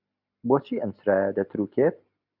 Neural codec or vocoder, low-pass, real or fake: none; 5.4 kHz; real